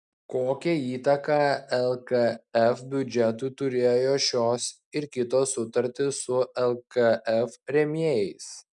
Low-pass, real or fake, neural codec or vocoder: 10.8 kHz; real; none